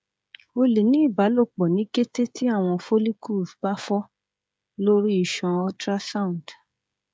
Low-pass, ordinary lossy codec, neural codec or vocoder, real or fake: none; none; codec, 16 kHz, 16 kbps, FreqCodec, smaller model; fake